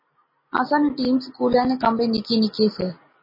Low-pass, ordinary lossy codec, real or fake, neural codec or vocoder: 5.4 kHz; MP3, 24 kbps; real; none